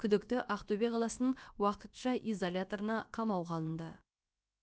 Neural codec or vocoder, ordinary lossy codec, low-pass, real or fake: codec, 16 kHz, about 1 kbps, DyCAST, with the encoder's durations; none; none; fake